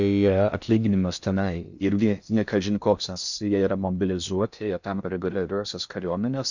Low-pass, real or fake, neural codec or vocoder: 7.2 kHz; fake; codec, 16 kHz in and 24 kHz out, 0.6 kbps, FocalCodec, streaming, 4096 codes